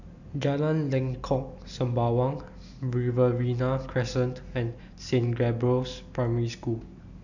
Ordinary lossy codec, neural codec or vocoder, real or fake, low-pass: none; none; real; 7.2 kHz